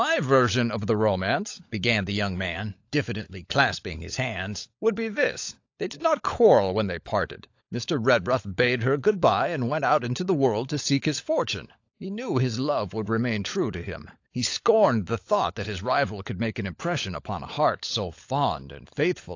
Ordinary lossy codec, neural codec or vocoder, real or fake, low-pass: AAC, 48 kbps; codec, 16 kHz, 16 kbps, FunCodec, trained on LibriTTS, 50 frames a second; fake; 7.2 kHz